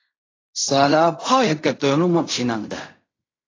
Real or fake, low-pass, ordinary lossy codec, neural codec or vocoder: fake; 7.2 kHz; AAC, 32 kbps; codec, 16 kHz in and 24 kHz out, 0.4 kbps, LongCat-Audio-Codec, fine tuned four codebook decoder